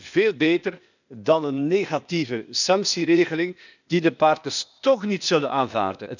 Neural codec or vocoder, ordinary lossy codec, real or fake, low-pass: codec, 16 kHz, 0.8 kbps, ZipCodec; none; fake; 7.2 kHz